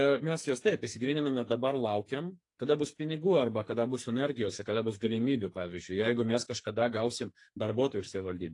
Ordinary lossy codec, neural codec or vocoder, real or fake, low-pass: AAC, 48 kbps; codec, 44.1 kHz, 2.6 kbps, SNAC; fake; 10.8 kHz